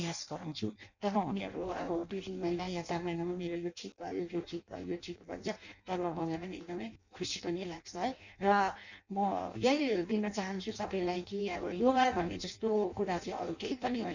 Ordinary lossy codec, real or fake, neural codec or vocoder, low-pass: none; fake; codec, 16 kHz in and 24 kHz out, 0.6 kbps, FireRedTTS-2 codec; 7.2 kHz